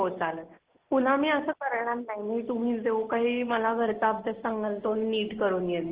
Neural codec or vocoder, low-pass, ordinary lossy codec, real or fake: none; 3.6 kHz; Opus, 16 kbps; real